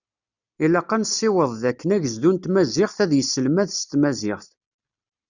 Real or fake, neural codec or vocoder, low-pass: real; none; 7.2 kHz